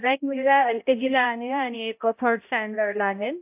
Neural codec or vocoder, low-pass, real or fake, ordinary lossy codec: codec, 16 kHz, 0.5 kbps, X-Codec, HuBERT features, trained on balanced general audio; 3.6 kHz; fake; AAC, 32 kbps